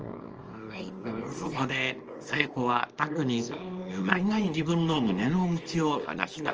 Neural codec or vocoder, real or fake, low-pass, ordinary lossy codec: codec, 24 kHz, 0.9 kbps, WavTokenizer, small release; fake; 7.2 kHz; Opus, 24 kbps